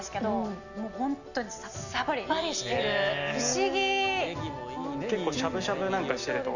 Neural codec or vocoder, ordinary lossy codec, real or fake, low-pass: none; none; real; 7.2 kHz